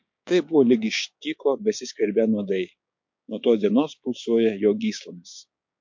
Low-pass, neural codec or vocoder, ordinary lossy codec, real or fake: 7.2 kHz; codec, 16 kHz, 6 kbps, DAC; MP3, 48 kbps; fake